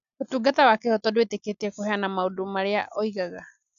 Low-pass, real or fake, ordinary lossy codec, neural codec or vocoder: 7.2 kHz; real; none; none